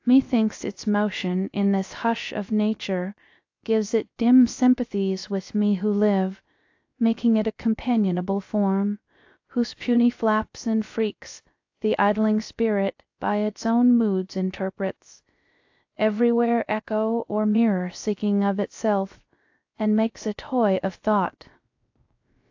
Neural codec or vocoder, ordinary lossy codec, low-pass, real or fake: codec, 16 kHz, 0.3 kbps, FocalCodec; AAC, 48 kbps; 7.2 kHz; fake